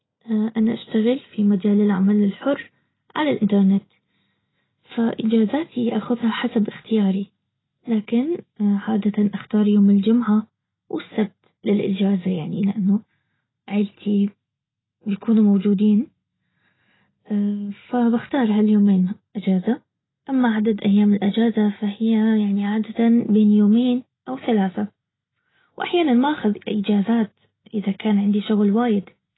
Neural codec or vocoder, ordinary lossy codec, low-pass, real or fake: none; AAC, 16 kbps; 7.2 kHz; real